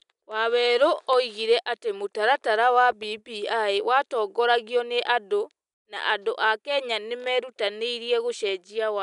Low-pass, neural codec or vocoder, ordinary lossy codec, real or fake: 10.8 kHz; none; none; real